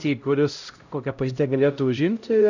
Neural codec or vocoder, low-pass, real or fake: codec, 16 kHz, 0.5 kbps, X-Codec, HuBERT features, trained on LibriSpeech; 7.2 kHz; fake